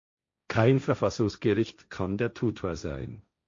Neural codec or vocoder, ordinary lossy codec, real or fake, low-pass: codec, 16 kHz, 1.1 kbps, Voila-Tokenizer; MP3, 48 kbps; fake; 7.2 kHz